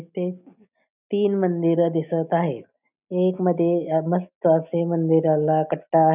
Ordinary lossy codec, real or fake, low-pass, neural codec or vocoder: none; real; 3.6 kHz; none